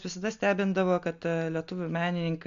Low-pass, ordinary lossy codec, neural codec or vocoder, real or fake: 7.2 kHz; AAC, 64 kbps; none; real